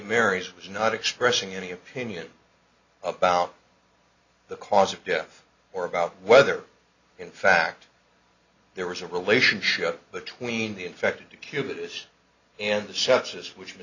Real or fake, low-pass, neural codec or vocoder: real; 7.2 kHz; none